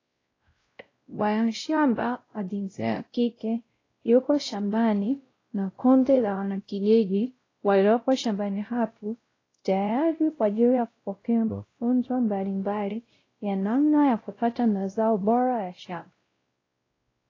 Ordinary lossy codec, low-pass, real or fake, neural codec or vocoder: AAC, 32 kbps; 7.2 kHz; fake; codec, 16 kHz, 0.5 kbps, X-Codec, WavLM features, trained on Multilingual LibriSpeech